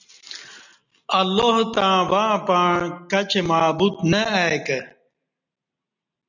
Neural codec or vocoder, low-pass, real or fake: none; 7.2 kHz; real